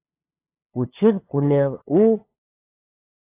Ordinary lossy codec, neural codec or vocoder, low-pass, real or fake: AAC, 24 kbps; codec, 16 kHz, 2 kbps, FunCodec, trained on LibriTTS, 25 frames a second; 3.6 kHz; fake